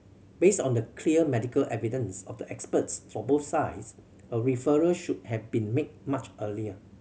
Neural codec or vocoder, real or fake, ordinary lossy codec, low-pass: none; real; none; none